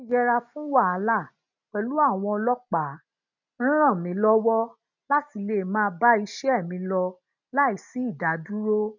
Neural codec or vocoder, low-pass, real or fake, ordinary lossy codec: none; 7.2 kHz; real; none